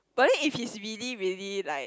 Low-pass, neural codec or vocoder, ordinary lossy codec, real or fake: none; none; none; real